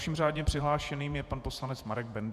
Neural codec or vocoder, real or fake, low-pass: vocoder, 48 kHz, 128 mel bands, Vocos; fake; 14.4 kHz